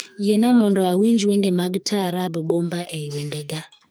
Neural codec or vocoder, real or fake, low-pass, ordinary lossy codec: codec, 44.1 kHz, 2.6 kbps, SNAC; fake; none; none